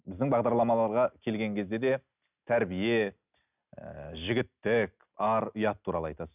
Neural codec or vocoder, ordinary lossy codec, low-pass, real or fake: none; none; 3.6 kHz; real